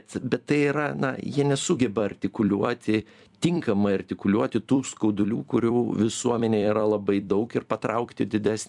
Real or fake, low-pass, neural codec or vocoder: fake; 10.8 kHz; vocoder, 44.1 kHz, 128 mel bands every 256 samples, BigVGAN v2